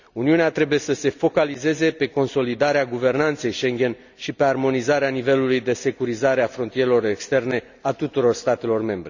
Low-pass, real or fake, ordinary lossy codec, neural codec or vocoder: 7.2 kHz; real; none; none